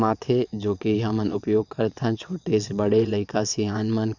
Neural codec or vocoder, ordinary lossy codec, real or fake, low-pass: vocoder, 22.05 kHz, 80 mel bands, WaveNeXt; none; fake; 7.2 kHz